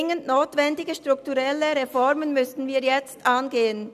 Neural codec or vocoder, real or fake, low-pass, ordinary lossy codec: none; real; 14.4 kHz; none